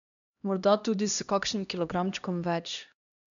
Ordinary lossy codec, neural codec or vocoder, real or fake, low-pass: none; codec, 16 kHz, 1 kbps, X-Codec, HuBERT features, trained on LibriSpeech; fake; 7.2 kHz